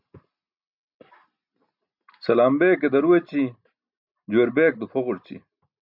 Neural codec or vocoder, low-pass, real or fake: none; 5.4 kHz; real